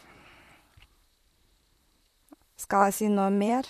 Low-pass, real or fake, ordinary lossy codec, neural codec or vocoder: 14.4 kHz; fake; MP3, 64 kbps; vocoder, 44.1 kHz, 128 mel bands, Pupu-Vocoder